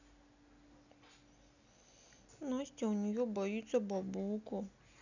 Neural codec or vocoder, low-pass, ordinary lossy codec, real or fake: none; 7.2 kHz; none; real